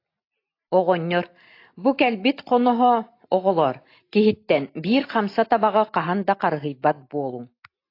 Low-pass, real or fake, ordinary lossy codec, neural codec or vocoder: 5.4 kHz; real; AAC, 32 kbps; none